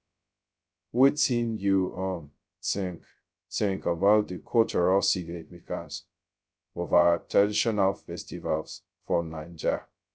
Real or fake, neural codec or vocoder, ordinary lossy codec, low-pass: fake; codec, 16 kHz, 0.2 kbps, FocalCodec; none; none